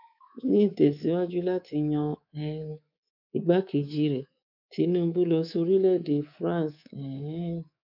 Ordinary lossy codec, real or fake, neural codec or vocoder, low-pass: none; fake; codec, 24 kHz, 3.1 kbps, DualCodec; 5.4 kHz